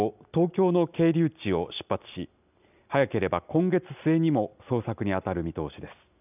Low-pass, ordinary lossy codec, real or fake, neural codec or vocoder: 3.6 kHz; none; real; none